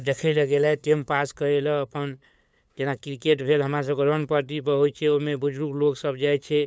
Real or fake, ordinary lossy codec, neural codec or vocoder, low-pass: fake; none; codec, 16 kHz, 8 kbps, FunCodec, trained on LibriTTS, 25 frames a second; none